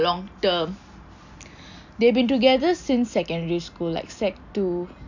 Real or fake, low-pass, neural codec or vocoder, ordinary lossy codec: real; 7.2 kHz; none; none